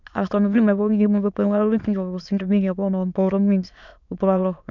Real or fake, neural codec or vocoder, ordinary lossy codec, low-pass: fake; autoencoder, 22.05 kHz, a latent of 192 numbers a frame, VITS, trained on many speakers; none; 7.2 kHz